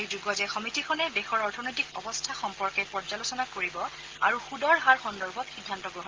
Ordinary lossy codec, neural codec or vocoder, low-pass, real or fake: Opus, 16 kbps; none; 7.2 kHz; real